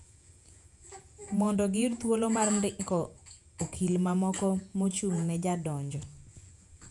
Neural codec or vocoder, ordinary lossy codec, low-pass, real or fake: vocoder, 48 kHz, 128 mel bands, Vocos; none; 10.8 kHz; fake